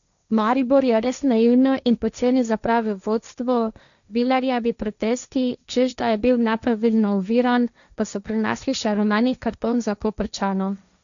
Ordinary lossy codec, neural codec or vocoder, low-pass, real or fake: none; codec, 16 kHz, 1.1 kbps, Voila-Tokenizer; 7.2 kHz; fake